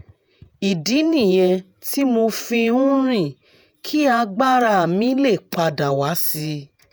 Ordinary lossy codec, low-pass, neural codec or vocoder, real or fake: none; none; vocoder, 48 kHz, 128 mel bands, Vocos; fake